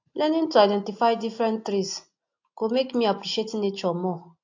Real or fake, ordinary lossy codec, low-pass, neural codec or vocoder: real; AAC, 48 kbps; 7.2 kHz; none